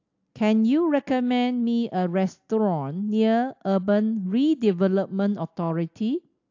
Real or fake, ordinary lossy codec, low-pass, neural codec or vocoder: real; AAC, 48 kbps; 7.2 kHz; none